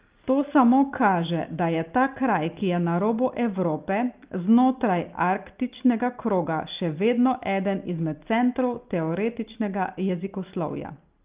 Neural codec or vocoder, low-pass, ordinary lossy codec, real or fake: none; 3.6 kHz; Opus, 32 kbps; real